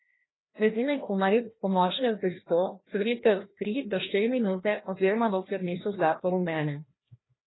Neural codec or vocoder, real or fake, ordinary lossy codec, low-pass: codec, 16 kHz, 1 kbps, FreqCodec, larger model; fake; AAC, 16 kbps; 7.2 kHz